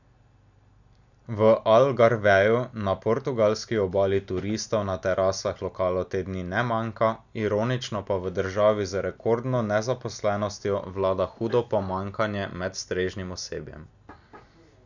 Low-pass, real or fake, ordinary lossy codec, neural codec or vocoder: 7.2 kHz; real; none; none